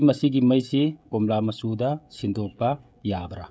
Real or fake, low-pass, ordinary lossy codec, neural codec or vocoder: fake; none; none; codec, 16 kHz, 16 kbps, FreqCodec, smaller model